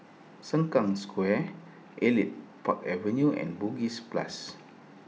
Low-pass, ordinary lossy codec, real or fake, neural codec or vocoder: none; none; real; none